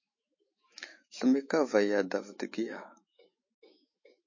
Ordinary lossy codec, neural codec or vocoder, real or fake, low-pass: MP3, 32 kbps; autoencoder, 48 kHz, 128 numbers a frame, DAC-VAE, trained on Japanese speech; fake; 7.2 kHz